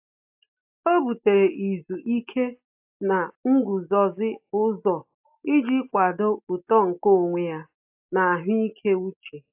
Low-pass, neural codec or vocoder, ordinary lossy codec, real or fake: 3.6 kHz; none; AAC, 32 kbps; real